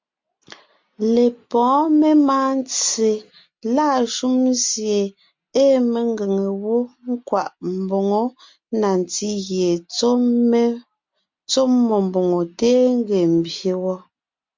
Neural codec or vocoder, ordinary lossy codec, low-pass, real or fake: none; MP3, 64 kbps; 7.2 kHz; real